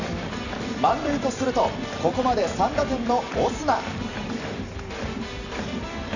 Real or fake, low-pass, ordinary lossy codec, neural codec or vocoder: fake; 7.2 kHz; none; vocoder, 44.1 kHz, 128 mel bands every 256 samples, BigVGAN v2